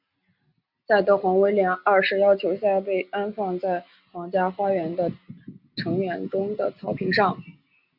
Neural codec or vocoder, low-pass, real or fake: none; 5.4 kHz; real